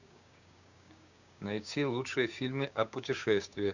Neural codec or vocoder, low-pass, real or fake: codec, 16 kHz, 6 kbps, DAC; 7.2 kHz; fake